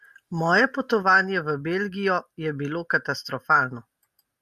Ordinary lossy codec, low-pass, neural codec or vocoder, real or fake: MP3, 96 kbps; 14.4 kHz; none; real